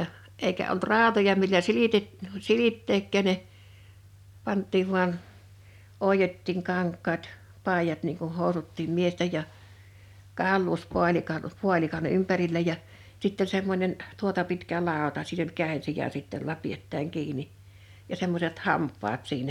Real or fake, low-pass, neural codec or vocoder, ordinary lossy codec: real; 19.8 kHz; none; none